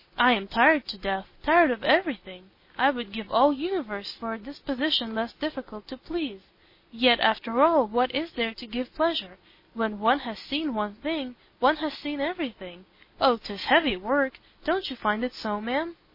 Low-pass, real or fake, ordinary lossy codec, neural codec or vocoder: 5.4 kHz; real; MP3, 24 kbps; none